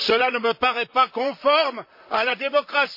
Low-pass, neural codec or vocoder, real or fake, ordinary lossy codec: 5.4 kHz; vocoder, 44.1 kHz, 128 mel bands, Pupu-Vocoder; fake; MP3, 32 kbps